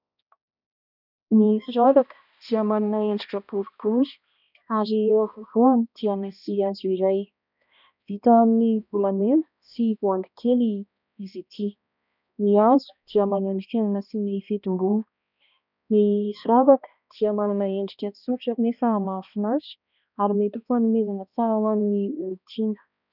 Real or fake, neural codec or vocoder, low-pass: fake; codec, 16 kHz, 1 kbps, X-Codec, HuBERT features, trained on balanced general audio; 5.4 kHz